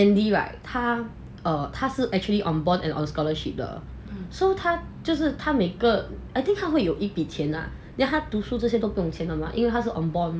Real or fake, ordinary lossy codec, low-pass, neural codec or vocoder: real; none; none; none